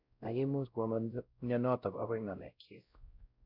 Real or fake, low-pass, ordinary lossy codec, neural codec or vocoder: fake; 5.4 kHz; none; codec, 16 kHz, 0.5 kbps, X-Codec, WavLM features, trained on Multilingual LibriSpeech